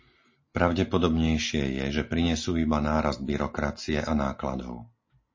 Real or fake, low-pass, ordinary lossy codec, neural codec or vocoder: real; 7.2 kHz; MP3, 32 kbps; none